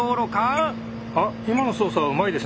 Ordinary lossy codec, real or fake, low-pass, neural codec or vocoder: none; real; none; none